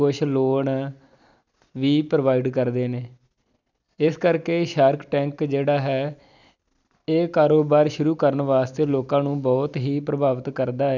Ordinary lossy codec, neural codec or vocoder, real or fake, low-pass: none; none; real; 7.2 kHz